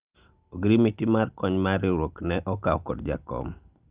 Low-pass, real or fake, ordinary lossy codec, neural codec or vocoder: 3.6 kHz; real; Opus, 32 kbps; none